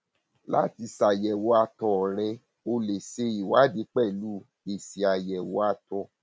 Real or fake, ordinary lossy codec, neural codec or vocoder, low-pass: real; none; none; none